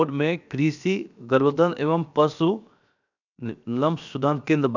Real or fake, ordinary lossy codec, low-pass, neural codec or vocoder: fake; none; 7.2 kHz; codec, 16 kHz, 0.7 kbps, FocalCodec